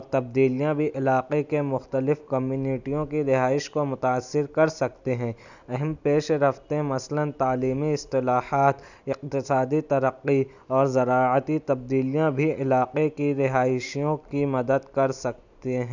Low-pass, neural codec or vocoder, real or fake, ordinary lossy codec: 7.2 kHz; none; real; none